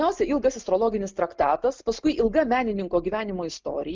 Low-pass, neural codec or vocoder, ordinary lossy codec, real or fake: 7.2 kHz; none; Opus, 32 kbps; real